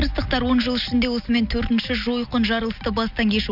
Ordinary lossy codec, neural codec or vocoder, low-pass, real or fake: none; none; 5.4 kHz; real